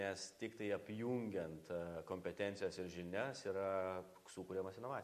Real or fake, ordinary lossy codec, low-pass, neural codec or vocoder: real; AAC, 96 kbps; 14.4 kHz; none